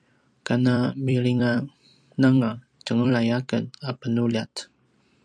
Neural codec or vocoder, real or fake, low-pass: vocoder, 44.1 kHz, 128 mel bands every 256 samples, BigVGAN v2; fake; 9.9 kHz